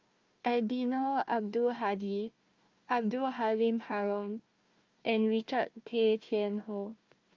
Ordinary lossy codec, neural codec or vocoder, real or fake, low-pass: Opus, 24 kbps; codec, 16 kHz, 1 kbps, FunCodec, trained on Chinese and English, 50 frames a second; fake; 7.2 kHz